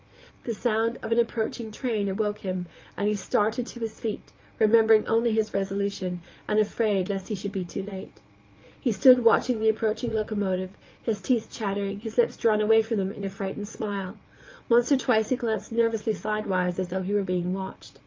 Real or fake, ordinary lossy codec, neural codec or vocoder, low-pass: fake; Opus, 24 kbps; vocoder, 22.05 kHz, 80 mel bands, Vocos; 7.2 kHz